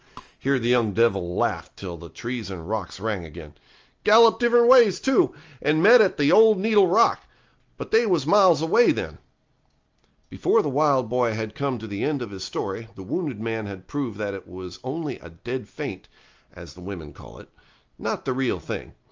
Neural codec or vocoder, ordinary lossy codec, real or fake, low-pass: none; Opus, 24 kbps; real; 7.2 kHz